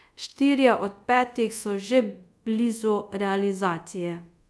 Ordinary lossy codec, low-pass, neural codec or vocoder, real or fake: none; none; codec, 24 kHz, 0.5 kbps, DualCodec; fake